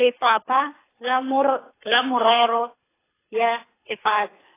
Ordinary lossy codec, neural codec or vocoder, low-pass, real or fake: AAC, 16 kbps; codec, 24 kHz, 3 kbps, HILCodec; 3.6 kHz; fake